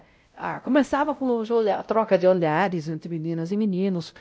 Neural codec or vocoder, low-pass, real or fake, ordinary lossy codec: codec, 16 kHz, 0.5 kbps, X-Codec, WavLM features, trained on Multilingual LibriSpeech; none; fake; none